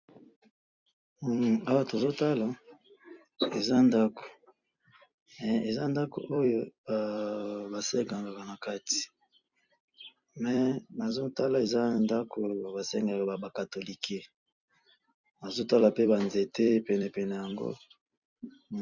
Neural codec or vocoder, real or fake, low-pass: vocoder, 44.1 kHz, 128 mel bands every 256 samples, BigVGAN v2; fake; 7.2 kHz